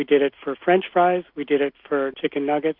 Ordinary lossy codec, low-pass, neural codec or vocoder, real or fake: Opus, 64 kbps; 5.4 kHz; none; real